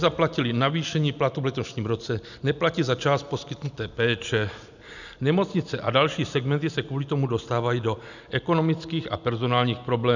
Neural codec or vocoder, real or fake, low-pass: none; real; 7.2 kHz